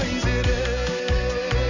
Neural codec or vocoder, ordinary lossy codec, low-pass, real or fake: none; none; 7.2 kHz; real